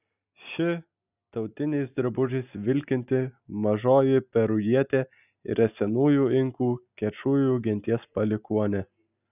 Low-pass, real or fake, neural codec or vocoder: 3.6 kHz; real; none